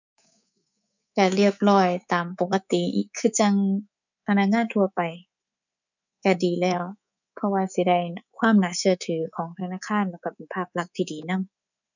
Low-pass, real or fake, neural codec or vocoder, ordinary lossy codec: 7.2 kHz; fake; codec, 24 kHz, 3.1 kbps, DualCodec; none